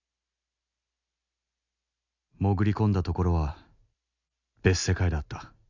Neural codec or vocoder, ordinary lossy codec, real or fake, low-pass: none; none; real; 7.2 kHz